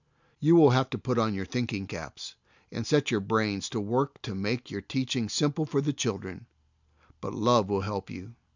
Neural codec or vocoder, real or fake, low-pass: none; real; 7.2 kHz